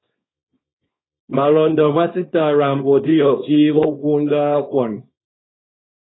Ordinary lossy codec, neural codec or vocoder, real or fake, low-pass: AAC, 16 kbps; codec, 24 kHz, 0.9 kbps, WavTokenizer, small release; fake; 7.2 kHz